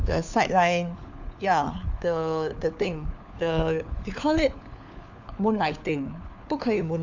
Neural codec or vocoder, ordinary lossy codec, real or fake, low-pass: codec, 16 kHz, 4 kbps, FunCodec, trained on LibriTTS, 50 frames a second; none; fake; 7.2 kHz